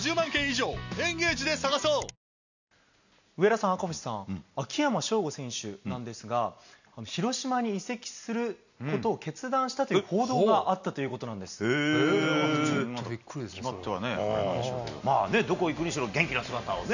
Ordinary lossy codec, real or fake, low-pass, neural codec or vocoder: none; real; 7.2 kHz; none